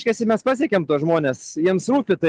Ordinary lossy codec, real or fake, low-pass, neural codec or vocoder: Opus, 32 kbps; real; 9.9 kHz; none